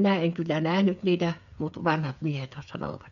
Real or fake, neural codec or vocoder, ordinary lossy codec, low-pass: fake; codec, 16 kHz, 8 kbps, FreqCodec, smaller model; none; 7.2 kHz